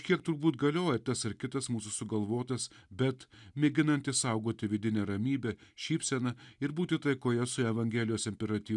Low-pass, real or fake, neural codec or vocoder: 10.8 kHz; real; none